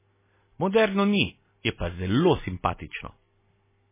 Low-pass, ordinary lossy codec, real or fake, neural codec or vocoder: 3.6 kHz; MP3, 16 kbps; real; none